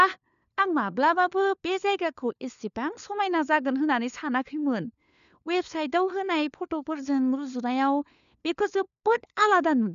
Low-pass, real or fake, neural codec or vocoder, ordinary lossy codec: 7.2 kHz; fake; codec, 16 kHz, 4 kbps, FunCodec, trained on LibriTTS, 50 frames a second; none